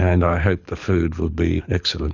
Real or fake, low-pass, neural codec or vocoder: fake; 7.2 kHz; codec, 24 kHz, 6 kbps, HILCodec